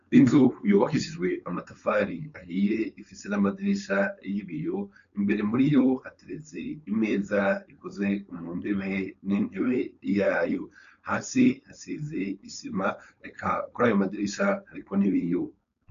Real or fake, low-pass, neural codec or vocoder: fake; 7.2 kHz; codec, 16 kHz, 4.8 kbps, FACodec